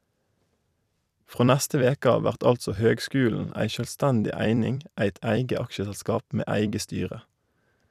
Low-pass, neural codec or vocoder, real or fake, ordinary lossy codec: 14.4 kHz; vocoder, 44.1 kHz, 128 mel bands every 256 samples, BigVGAN v2; fake; none